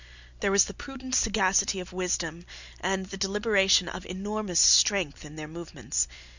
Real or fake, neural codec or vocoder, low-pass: real; none; 7.2 kHz